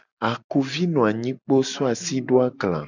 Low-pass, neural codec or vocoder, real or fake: 7.2 kHz; none; real